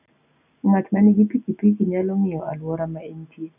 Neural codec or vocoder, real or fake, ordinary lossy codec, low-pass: none; real; none; 3.6 kHz